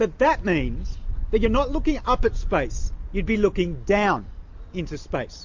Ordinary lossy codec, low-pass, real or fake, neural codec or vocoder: MP3, 48 kbps; 7.2 kHz; real; none